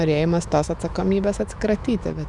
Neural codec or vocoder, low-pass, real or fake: autoencoder, 48 kHz, 128 numbers a frame, DAC-VAE, trained on Japanese speech; 10.8 kHz; fake